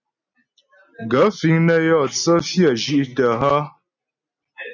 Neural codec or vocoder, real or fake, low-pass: none; real; 7.2 kHz